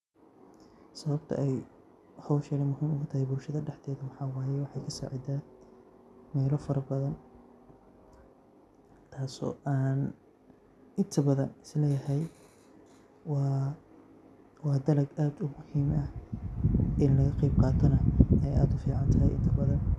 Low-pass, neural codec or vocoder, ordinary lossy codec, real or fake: none; none; none; real